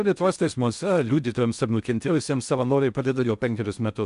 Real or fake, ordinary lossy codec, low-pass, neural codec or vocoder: fake; MP3, 96 kbps; 10.8 kHz; codec, 16 kHz in and 24 kHz out, 0.6 kbps, FocalCodec, streaming, 4096 codes